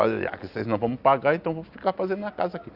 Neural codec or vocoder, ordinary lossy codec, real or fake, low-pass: none; Opus, 64 kbps; real; 5.4 kHz